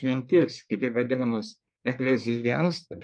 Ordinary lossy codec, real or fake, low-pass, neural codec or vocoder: MP3, 64 kbps; fake; 9.9 kHz; codec, 24 kHz, 1 kbps, SNAC